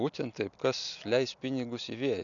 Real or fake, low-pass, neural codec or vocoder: real; 7.2 kHz; none